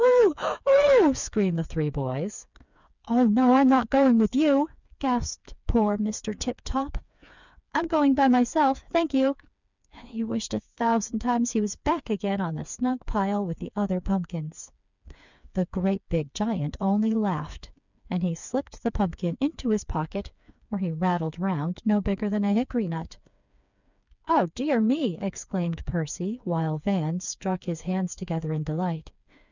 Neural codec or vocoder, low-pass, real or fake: codec, 16 kHz, 4 kbps, FreqCodec, smaller model; 7.2 kHz; fake